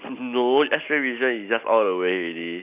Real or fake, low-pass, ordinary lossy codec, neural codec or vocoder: real; 3.6 kHz; none; none